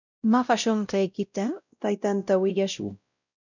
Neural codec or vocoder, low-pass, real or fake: codec, 16 kHz, 0.5 kbps, X-Codec, WavLM features, trained on Multilingual LibriSpeech; 7.2 kHz; fake